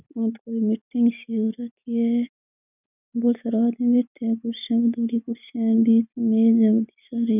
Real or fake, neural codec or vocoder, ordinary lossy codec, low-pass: real; none; none; 3.6 kHz